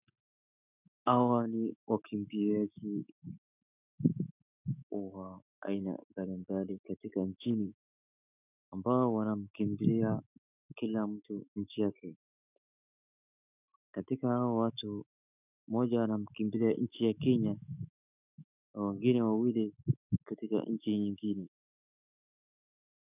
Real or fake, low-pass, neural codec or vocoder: fake; 3.6 kHz; autoencoder, 48 kHz, 128 numbers a frame, DAC-VAE, trained on Japanese speech